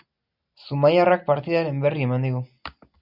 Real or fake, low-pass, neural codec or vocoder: real; 5.4 kHz; none